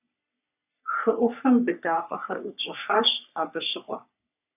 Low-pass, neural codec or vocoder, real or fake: 3.6 kHz; codec, 44.1 kHz, 3.4 kbps, Pupu-Codec; fake